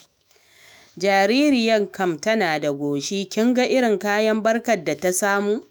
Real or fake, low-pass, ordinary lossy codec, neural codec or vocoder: fake; none; none; autoencoder, 48 kHz, 128 numbers a frame, DAC-VAE, trained on Japanese speech